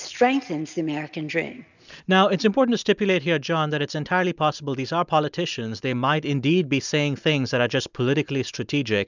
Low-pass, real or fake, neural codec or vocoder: 7.2 kHz; real; none